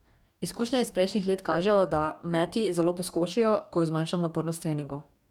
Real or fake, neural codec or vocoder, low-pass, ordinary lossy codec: fake; codec, 44.1 kHz, 2.6 kbps, DAC; 19.8 kHz; none